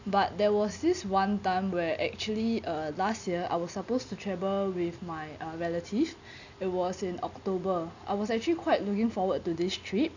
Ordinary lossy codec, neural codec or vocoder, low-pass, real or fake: none; none; 7.2 kHz; real